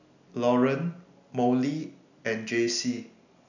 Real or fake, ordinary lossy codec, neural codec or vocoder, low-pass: real; none; none; 7.2 kHz